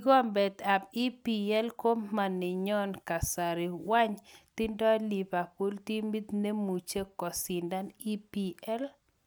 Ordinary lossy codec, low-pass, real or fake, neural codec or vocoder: none; none; real; none